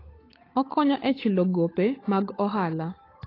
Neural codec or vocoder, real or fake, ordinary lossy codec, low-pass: codec, 16 kHz, 8 kbps, FunCodec, trained on Chinese and English, 25 frames a second; fake; AAC, 24 kbps; 5.4 kHz